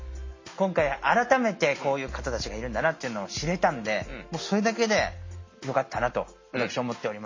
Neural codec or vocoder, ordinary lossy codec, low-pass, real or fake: none; MP3, 32 kbps; 7.2 kHz; real